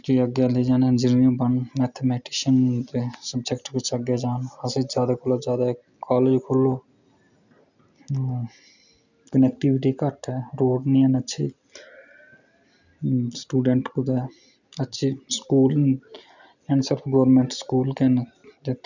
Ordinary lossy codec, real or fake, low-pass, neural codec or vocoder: none; real; 7.2 kHz; none